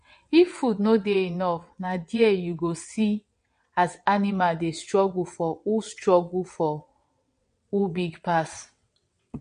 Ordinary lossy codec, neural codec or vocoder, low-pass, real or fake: MP3, 48 kbps; vocoder, 22.05 kHz, 80 mel bands, WaveNeXt; 9.9 kHz; fake